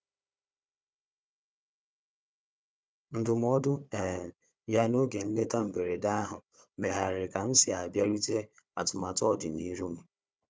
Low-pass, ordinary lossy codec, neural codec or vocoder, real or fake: none; none; codec, 16 kHz, 4 kbps, FunCodec, trained on Chinese and English, 50 frames a second; fake